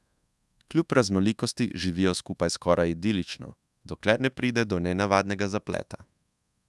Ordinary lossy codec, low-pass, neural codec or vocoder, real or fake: none; none; codec, 24 kHz, 1.2 kbps, DualCodec; fake